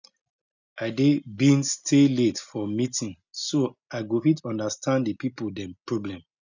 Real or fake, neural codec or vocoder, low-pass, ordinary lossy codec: real; none; 7.2 kHz; none